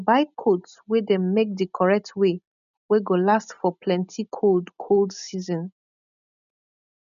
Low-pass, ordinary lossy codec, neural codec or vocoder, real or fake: 7.2 kHz; none; none; real